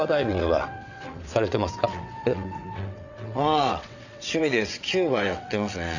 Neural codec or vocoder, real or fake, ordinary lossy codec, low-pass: vocoder, 22.05 kHz, 80 mel bands, WaveNeXt; fake; none; 7.2 kHz